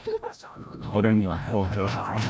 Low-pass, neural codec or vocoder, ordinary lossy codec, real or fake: none; codec, 16 kHz, 0.5 kbps, FreqCodec, larger model; none; fake